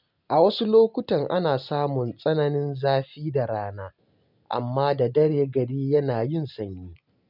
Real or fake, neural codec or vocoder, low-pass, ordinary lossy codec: real; none; 5.4 kHz; none